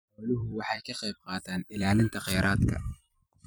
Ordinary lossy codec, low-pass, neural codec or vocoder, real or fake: none; none; vocoder, 44.1 kHz, 128 mel bands every 256 samples, BigVGAN v2; fake